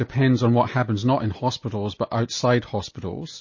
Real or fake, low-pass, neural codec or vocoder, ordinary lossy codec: real; 7.2 kHz; none; MP3, 32 kbps